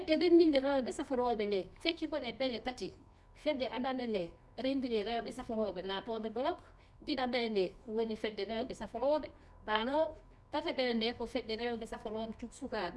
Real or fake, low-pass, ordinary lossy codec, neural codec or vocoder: fake; none; none; codec, 24 kHz, 0.9 kbps, WavTokenizer, medium music audio release